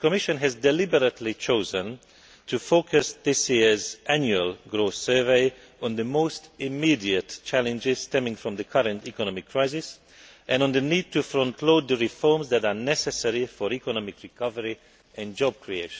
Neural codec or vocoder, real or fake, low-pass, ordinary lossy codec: none; real; none; none